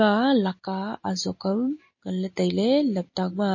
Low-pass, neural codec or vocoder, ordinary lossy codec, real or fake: 7.2 kHz; none; MP3, 32 kbps; real